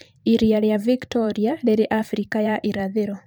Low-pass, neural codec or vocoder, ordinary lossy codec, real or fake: none; vocoder, 44.1 kHz, 128 mel bands every 512 samples, BigVGAN v2; none; fake